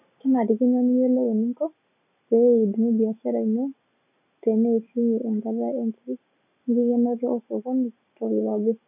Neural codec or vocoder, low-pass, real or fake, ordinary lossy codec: none; 3.6 kHz; real; none